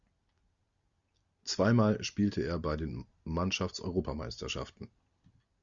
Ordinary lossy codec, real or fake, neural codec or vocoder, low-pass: Opus, 64 kbps; real; none; 7.2 kHz